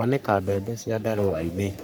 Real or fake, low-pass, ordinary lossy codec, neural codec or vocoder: fake; none; none; codec, 44.1 kHz, 3.4 kbps, Pupu-Codec